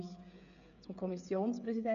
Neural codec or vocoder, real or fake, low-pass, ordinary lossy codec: codec, 16 kHz, 16 kbps, FreqCodec, smaller model; fake; 7.2 kHz; none